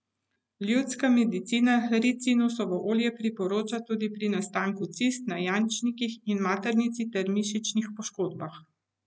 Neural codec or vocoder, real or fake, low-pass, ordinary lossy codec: none; real; none; none